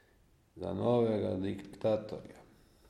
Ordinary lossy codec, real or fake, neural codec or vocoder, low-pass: MP3, 64 kbps; real; none; 19.8 kHz